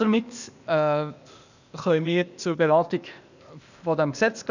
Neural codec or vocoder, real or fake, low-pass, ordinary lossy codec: codec, 16 kHz, 0.8 kbps, ZipCodec; fake; 7.2 kHz; none